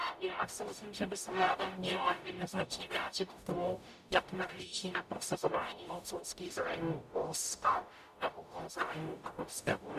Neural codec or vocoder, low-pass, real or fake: codec, 44.1 kHz, 0.9 kbps, DAC; 14.4 kHz; fake